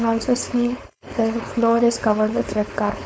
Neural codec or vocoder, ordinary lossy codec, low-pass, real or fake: codec, 16 kHz, 4.8 kbps, FACodec; none; none; fake